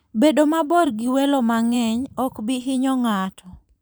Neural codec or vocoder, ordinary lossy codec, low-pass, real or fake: vocoder, 44.1 kHz, 128 mel bands every 512 samples, BigVGAN v2; none; none; fake